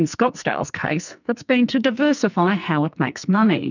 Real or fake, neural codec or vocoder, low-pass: fake; codec, 44.1 kHz, 2.6 kbps, SNAC; 7.2 kHz